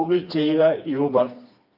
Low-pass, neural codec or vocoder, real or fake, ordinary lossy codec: 5.4 kHz; codec, 16 kHz, 2 kbps, FreqCodec, smaller model; fake; AAC, 48 kbps